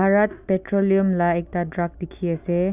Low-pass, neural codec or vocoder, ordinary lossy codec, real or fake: 3.6 kHz; none; none; real